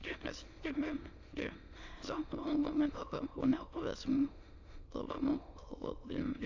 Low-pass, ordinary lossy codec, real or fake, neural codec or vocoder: 7.2 kHz; AAC, 48 kbps; fake; autoencoder, 22.05 kHz, a latent of 192 numbers a frame, VITS, trained on many speakers